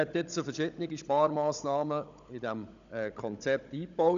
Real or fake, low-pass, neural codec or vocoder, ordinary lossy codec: fake; 7.2 kHz; codec, 16 kHz, 16 kbps, FunCodec, trained on Chinese and English, 50 frames a second; none